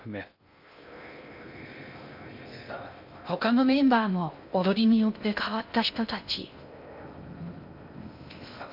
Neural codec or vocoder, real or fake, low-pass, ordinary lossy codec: codec, 16 kHz in and 24 kHz out, 0.6 kbps, FocalCodec, streaming, 2048 codes; fake; 5.4 kHz; none